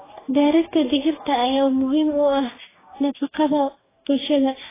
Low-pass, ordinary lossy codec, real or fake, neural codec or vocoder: 3.6 kHz; AAC, 16 kbps; fake; codec, 44.1 kHz, 2.6 kbps, DAC